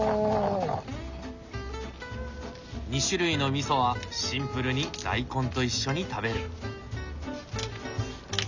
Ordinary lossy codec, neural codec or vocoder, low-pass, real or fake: none; none; 7.2 kHz; real